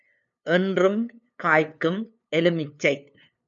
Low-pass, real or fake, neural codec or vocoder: 7.2 kHz; fake; codec, 16 kHz, 2 kbps, FunCodec, trained on LibriTTS, 25 frames a second